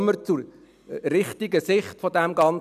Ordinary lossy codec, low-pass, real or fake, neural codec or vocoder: none; 14.4 kHz; real; none